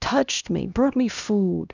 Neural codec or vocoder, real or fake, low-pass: codec, 16 kHz, 1 kbps, X-Codec, HuBERT features, trained on LibriSpeech; fake; 7.2 kHz